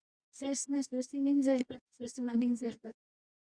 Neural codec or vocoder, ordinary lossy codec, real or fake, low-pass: codec, 24 kHz, 0.9 kbps, WavTokenizer, medium music audio release; Opus, 64 kbps; fake; 9.9 kHz